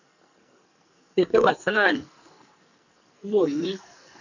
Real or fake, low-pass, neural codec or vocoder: fake; 7.2 kHz; codec, 44.1 kHz, 2.6 kbps, SNAC